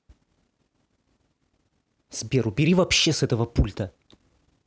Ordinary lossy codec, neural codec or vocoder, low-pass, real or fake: none; none; none; real